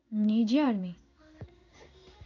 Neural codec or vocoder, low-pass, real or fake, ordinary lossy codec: codec, 16 kHz in and 24 kHz out, 1 kbps, XY-Tokenizer; 7.2 kHz; fake; none